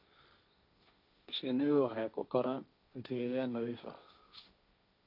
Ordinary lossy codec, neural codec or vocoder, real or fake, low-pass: none; codec, 16 kHz, 1.1 kbps, Voila-Tokenizer; fake; 5.4 kHz